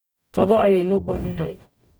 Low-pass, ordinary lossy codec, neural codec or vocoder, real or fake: none; none; codec, 44.1 kHz, 0.9 kbps, DAC; fake